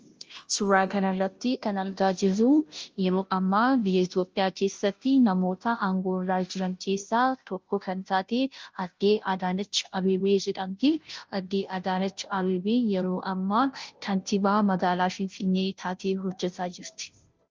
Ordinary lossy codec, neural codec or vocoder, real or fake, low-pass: Opus, 24 kbps; codec, 16 kHz, 0.5 kbps, FunCodec, trained on Chinese and English, 25 frames a second; fake; 7.2 kHz